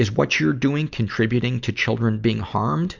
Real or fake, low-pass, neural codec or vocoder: real; 7.2 kHz; none